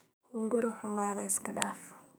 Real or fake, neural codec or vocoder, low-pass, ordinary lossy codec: fake; codec, 44.1 kHz, 2.6 kbps, SNAC; none; none